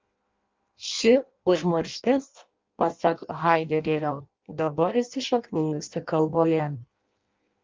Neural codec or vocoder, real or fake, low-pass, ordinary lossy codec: codec, 16 kHz in and 24 kHz out, 0.6 kbps, FireRedTTS-2 codec; fake; 7.2 kHz; Opus, 24 kbps